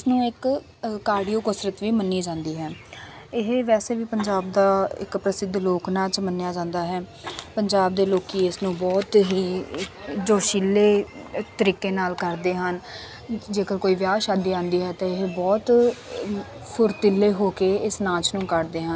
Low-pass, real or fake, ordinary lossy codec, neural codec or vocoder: none; real; none; none